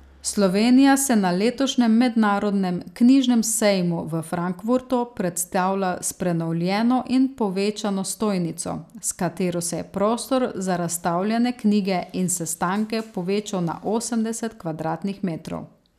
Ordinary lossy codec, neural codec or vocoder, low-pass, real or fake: none; none; 14.4 kHz; real